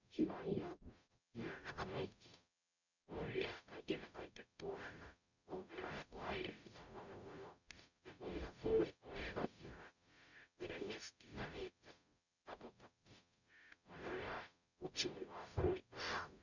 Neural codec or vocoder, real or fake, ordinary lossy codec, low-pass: codec, 44.1 kHz, 0.9 kbps, DAC; fake; none; 7.2 kHz